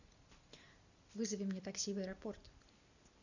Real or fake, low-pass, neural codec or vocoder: real; 7.2 kHz; none